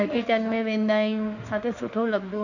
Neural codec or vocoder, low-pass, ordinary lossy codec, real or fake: autoencoder, 48 kHz, 32 numbers a frame, DAC-VAE, trained on Japanese speech; 7.2 kHz; none; fake